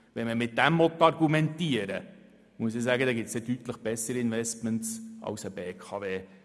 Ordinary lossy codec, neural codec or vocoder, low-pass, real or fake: none; none; none; real